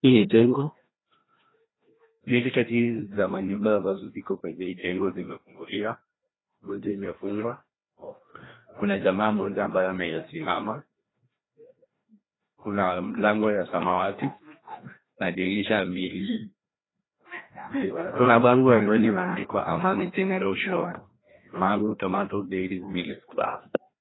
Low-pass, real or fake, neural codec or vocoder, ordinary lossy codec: 7.2 kHz; fake; codec, 16 kHz, 1 kbps, FreqCodec, larger model; AAC, 16 kbps